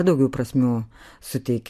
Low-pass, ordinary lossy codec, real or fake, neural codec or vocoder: 14.4 kHz; MP3, 64 kbps; real; none